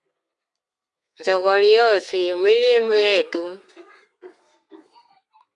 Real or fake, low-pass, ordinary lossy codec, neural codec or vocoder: fake; 10.8 kHz; Opus, 64 kbps; codec, 32 kHz, 1.9 kbps, SNAC